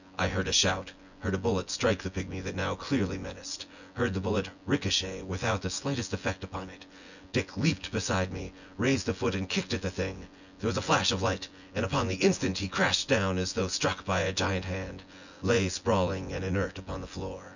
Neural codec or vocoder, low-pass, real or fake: vocoder, 24 kHz, 100 mel bands, Vocos; 7.2 kHz; fake